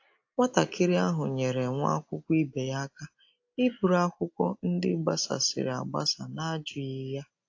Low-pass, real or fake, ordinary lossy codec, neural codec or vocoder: 7.2 kHz; real; AAC, 48 kbps; none